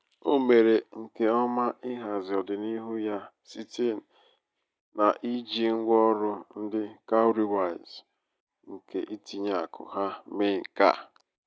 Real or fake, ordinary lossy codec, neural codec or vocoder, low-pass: real; none; none; none